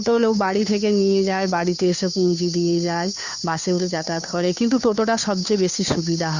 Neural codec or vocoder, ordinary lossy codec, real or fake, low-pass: codec, 16 kHz, 2 kbps, FunCodec, trained on Chinese and English, 25 frames a second; none; fake; 7.2 kHz